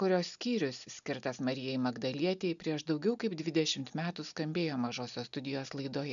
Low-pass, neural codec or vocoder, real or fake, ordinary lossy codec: 7.2 kHz; none; real; MP3, 96 kbps